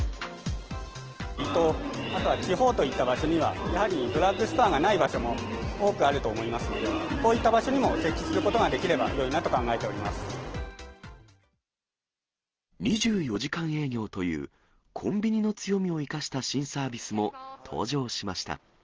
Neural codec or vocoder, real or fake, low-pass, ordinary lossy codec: none; real; 7.2 kHz; Opus, 16 kbps